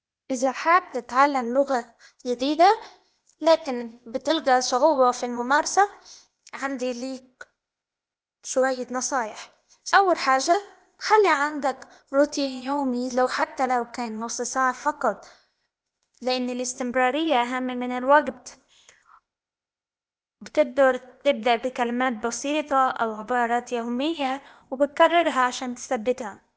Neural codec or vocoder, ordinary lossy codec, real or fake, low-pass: codec, 16 kHz, 0.8 kbps, ZipCodec; none; fake; none